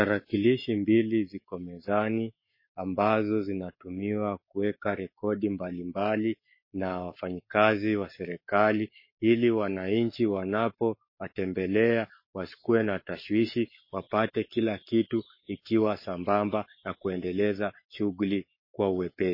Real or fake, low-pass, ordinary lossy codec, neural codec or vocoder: fake; 5.4 kHz; MP3, 24 kbps; codec, 44.1 kHz, 7.8 kbps, Pupu-Codec